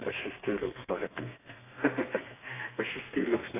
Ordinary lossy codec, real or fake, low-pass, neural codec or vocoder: AAC, 24 kbps; fake; 3.6 kHz; codec, 32 kHz, 1.9 kbps, SNAC